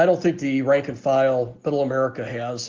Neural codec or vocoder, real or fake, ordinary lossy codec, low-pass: none; real; Opus, 16 kbps; 7.2 kHz